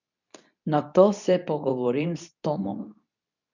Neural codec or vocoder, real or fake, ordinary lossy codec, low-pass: codec, 24 kHz, 0.9 kbps, WavTokenizer, medium speech release version 2; fake; none; 7.2 kHz